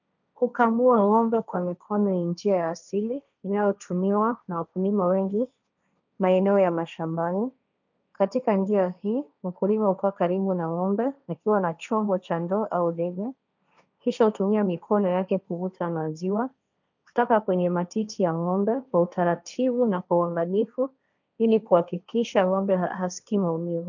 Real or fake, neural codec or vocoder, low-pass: fake; codec, 16 kHz, 1.1 kbps, Voila-Tokenizer; 7.2 kHz